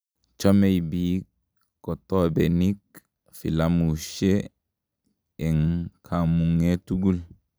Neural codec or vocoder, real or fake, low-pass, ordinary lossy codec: none; real; none; none